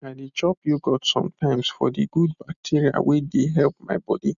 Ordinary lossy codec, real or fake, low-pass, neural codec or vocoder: none; real; 7.2 kHz; none